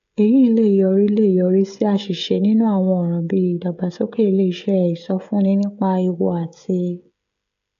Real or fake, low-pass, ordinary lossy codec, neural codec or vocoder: fake; 7.2 kHz; AAC, 96 kbps; codec, 16 kHz, 16 kbps, FreqCodec, smaller model